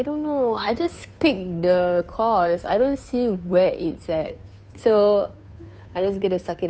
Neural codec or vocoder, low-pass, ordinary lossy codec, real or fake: codec, 16 kHz, 2 kbps, FunCodec, trained on Chinese and English, 25 frames a second; none; none; fake